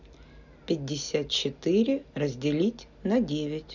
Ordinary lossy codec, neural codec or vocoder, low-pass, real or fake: none; none; 7.2 kHz; real